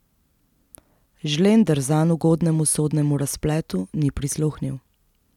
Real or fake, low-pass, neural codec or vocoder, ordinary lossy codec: real; 19.8 kHz; none; none